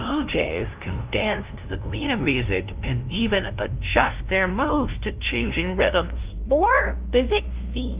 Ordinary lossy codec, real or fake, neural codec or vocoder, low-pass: Opus, 32 kbps; fake; codec, 16 kHz, 0.5 kbps, FunCodec, trained on LibriTTS, 25 frames a second; 3.6 kHz